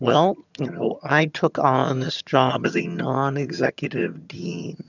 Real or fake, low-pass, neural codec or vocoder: fake; 7.2 kHz; vocoder, 22.05 kHz, 80 mel bands, HiFi-GAN